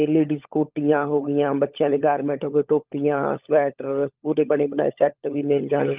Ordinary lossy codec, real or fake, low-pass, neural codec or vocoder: Opus, 32 kbps; fake; 3.6 kHz; codec, 16 kHz, 16 kbps, FunCodec, trained on LibriTTS, 50 frames a second